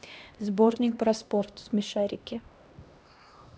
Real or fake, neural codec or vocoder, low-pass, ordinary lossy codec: fake; codec, 16 kHz, 1 kbps, X-Codec, HuBERT features, trained on LibriSpeech; none; none